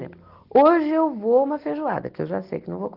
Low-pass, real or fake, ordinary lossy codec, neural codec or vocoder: 5.4 kHz; real; Opus, 16 kbps; none